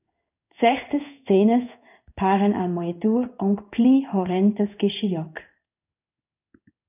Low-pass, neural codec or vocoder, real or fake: 3.6 kHz; codec, 16 kHz in and 24 kHz out, 1 kbps, XY-Tokenizer; fake